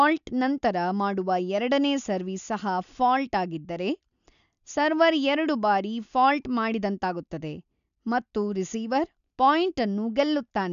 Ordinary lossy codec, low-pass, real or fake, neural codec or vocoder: none; 7.2 kHz; real; none